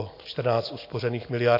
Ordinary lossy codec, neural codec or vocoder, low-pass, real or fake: AAC, 32 kbps; none; 5.4 kHz; real